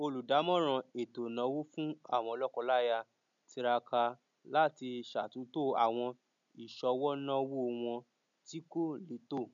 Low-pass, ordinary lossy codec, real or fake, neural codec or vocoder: 7.2 kHz; none; real; none